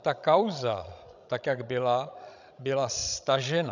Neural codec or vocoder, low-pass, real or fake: codec, 16 kHz, 16 kbps, FreqCodec, larger model; 7.2 kHz; fake